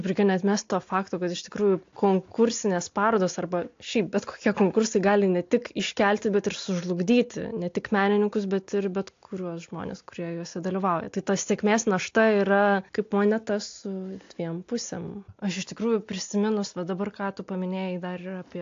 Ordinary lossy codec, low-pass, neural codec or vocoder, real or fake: AAC, 48 kbps; 7.2 kHz; none; real